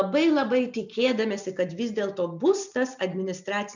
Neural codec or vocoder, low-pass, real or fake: none; 7.2 kHz; real